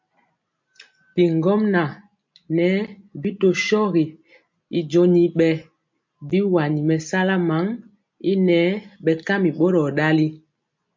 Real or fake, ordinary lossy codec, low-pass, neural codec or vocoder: real; MP3, 48 kbps; 7.2 kHz; none